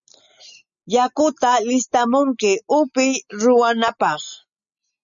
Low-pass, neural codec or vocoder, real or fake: 7.2 kHz; none; real